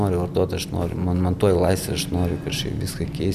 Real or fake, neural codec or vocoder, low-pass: real; none; 14.4 kHz